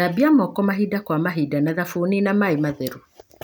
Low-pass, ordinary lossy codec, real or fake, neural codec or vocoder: none; none; real; none